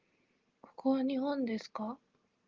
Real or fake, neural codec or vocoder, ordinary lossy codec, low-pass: real; none; Opus, 32 kbps; 7.2 kHz